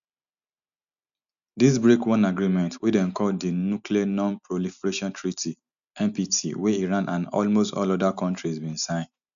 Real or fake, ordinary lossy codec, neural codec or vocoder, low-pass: real; none; none; 7.2 kHz